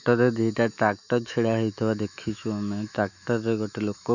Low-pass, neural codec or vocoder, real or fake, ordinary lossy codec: 7.2 kHz; autoencoder, 48 kHz, 128 numbers a frame, DAC-VAE, trained on Japanese speech; fake; none